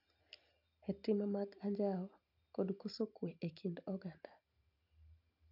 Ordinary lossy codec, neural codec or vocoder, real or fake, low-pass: none; none; real; 5.4 kHz